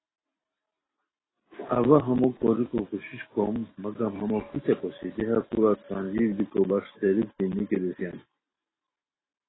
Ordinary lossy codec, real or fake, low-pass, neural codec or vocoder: AAC, 16 kbps; real; 7.2 kHz; none